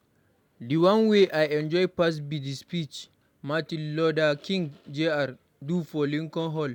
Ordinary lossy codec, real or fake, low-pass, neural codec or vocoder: none; real; 19.8 kHz; none